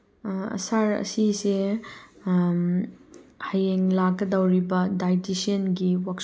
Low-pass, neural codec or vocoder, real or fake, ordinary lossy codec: none; none; real; none